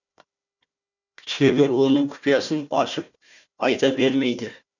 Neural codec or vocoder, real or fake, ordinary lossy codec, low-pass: codec, 16 kHz, 1 kbps, FunCodec, trained on Chinese and English, 50 frames a second; fake; none; 7.2 kHz